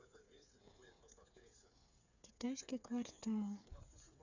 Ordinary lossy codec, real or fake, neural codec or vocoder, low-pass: none; fake; codec, 16 kHz, 8 kbps, FreqCodec, smaller model; 7.2 kHz